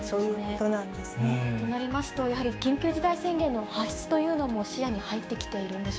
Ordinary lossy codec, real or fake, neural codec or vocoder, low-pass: none; fake; codec, 16 kHz, 6 kbps, DAC; none